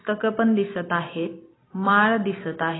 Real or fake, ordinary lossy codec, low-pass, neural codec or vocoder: real; AAC, 16 kbps; 7.2 kHz; none